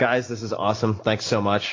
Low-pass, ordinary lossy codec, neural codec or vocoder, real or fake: 7.2 kHz; AAC, 32 kbps; none; real